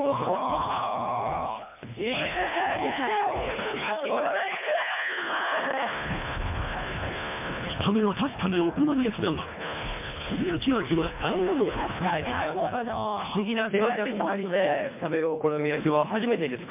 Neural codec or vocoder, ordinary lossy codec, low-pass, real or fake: codec, 24 kHz, 1.5 kbps, HILCodec; MP3, 32 kbps; 3.6 kHz; fake